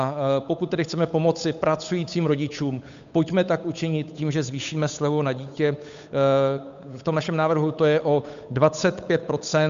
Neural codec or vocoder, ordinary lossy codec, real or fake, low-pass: codec, 16 kHz, 8 kbps, FunCodec, trained on Chinese and English, 25 frames a second; MP3, 64 kbps; fake; 7.2 kHz